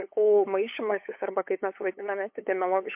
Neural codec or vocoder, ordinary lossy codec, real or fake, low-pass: codec, 16 kHz, 8 kbps, FunCodec, trained on LibriTTS, 25 frames a second; MP3, 32 kbps; fake; 3.6 kHz